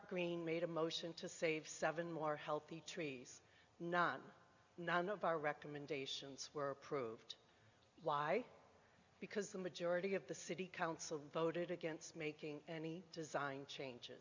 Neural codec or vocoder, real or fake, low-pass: none; real; 7.2 kHz